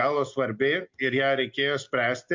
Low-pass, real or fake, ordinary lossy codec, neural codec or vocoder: 7.2 kHz; real; MP3, 48 kbps; none